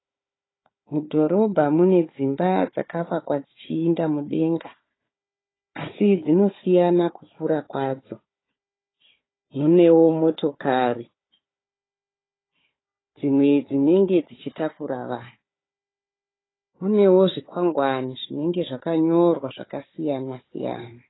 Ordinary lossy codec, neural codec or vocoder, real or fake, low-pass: AAC, 16 kbps; codec, 16 kHz, 4 kbps, FunCodec, trained on Chinese and English, 50 frames a second; fake; 7.2 kHz